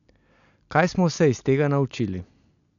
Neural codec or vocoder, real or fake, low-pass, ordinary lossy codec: none; real; 7.2 kHz; none